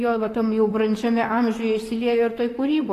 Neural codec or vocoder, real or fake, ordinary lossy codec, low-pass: vocoder, 44.1 kHz, 128 mel bands, Pupu-Vocoder; fake; AAC, 48 kbps; 14.4 kHz